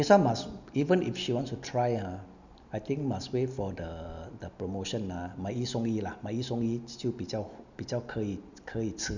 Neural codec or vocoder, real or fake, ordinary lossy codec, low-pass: none; real; none; 7.2 kHz